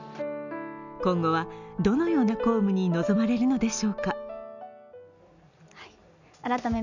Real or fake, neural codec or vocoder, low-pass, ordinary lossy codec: real; none; 7.2 kHz; none